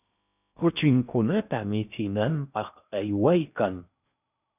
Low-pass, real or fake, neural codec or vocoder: 3.6 kHz; fake; codec, 16 kHz in and 24 kHz out, 0.8 kbps, FocalCodec, streaming, 65536 codes